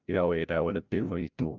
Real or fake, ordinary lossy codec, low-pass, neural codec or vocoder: fake; none; 7.2 kHz; codec, 16 kHz, 0.5 kbps, FreqCodec, larger model